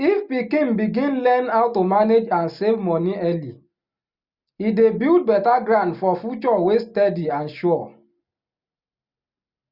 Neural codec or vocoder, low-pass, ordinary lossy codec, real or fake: none; 5.4 kHz; Opus, 64 kbps; real